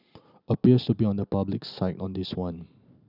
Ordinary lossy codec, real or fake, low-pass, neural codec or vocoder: none; real; 5.4 kHz; none